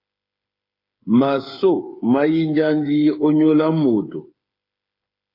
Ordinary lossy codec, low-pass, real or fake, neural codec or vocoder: AAC, 32 kbps; 5.4 kHz; fake; codec, 16 kHz, 8 kbps, FreqCodec, smaller model